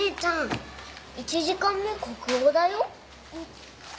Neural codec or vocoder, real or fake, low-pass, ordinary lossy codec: none; real; none; none